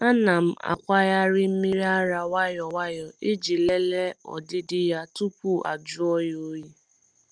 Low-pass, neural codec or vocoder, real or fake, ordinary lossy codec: 9.9 kHz; none; real; Opus, 32 kbps